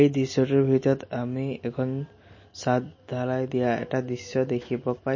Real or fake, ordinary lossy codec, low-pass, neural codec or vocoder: real; MP3, 32 kbps; 7.2 kHz; none